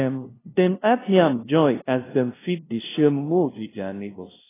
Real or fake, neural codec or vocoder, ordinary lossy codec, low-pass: fake; codec, 16 kHz, 0.5 kbps, FunCodec, trained on LibriTTS, 25 frames a second; AAC, 16 kbps; 3.6 kHz